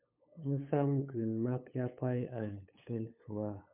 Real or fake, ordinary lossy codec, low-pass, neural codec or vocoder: fake; MP3, 32 kbps; 3.6 kHz; codec, 16 kHz, 8 kbps, FunCodec, trained on LibriTTS, 25 frames a second